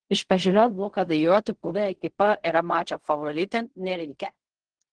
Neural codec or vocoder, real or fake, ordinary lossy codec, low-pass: codec, 16 kHz in and 24 kHz out, 0.4 kbps, LongCat-Audio-Codec, fine tuned four codebook decoder; fake; Opus, 16 kbps; 9.9 kHz